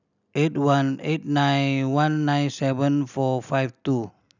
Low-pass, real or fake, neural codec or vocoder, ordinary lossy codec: 7.2 kHz; real; none; none